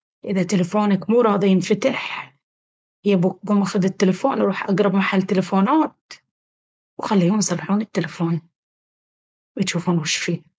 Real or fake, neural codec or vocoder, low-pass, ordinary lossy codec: fake; codec, 16 kHz, 4.8 kbps, FACodec; none; none